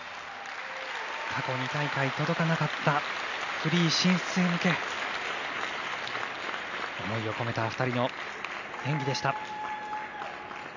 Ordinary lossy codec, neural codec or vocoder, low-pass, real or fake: none; none; 7.2 kHz; real